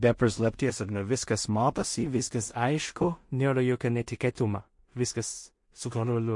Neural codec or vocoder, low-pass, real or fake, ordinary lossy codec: codec, 16 kHz in and 24 kHz out, 0.4 kbps, LongCat-Audio-Codec, two codebook decoder; 10.8 kHz; fake; MP3, 48 kbps